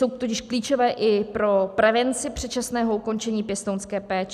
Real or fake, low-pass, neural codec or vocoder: real; 14.4 kHz; none